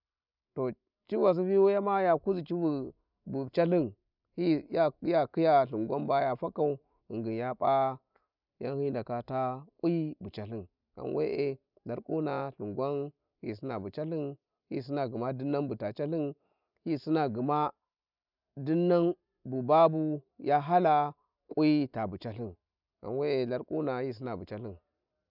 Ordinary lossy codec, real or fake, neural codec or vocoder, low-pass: none; real; none; 5.4 kHz